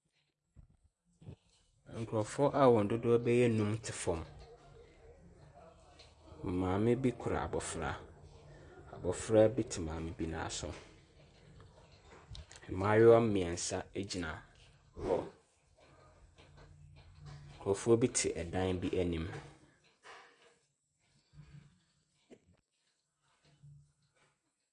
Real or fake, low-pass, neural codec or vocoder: real; 9.9 kHz; none